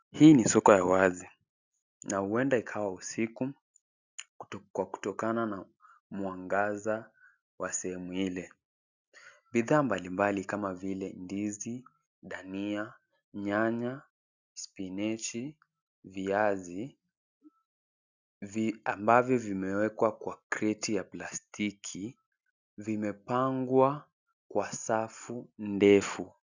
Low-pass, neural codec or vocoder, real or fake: 7.2 kHz; none; real